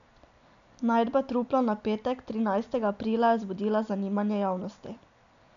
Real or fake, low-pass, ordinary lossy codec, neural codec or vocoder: real; 7.2 kHz; none; none